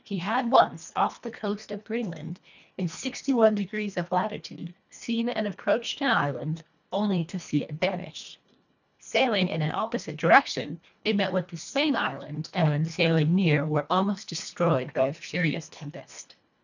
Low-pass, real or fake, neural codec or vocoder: 7.2 kHz; fake; codec, 24 kHz, 1.5 kbps, HILCodec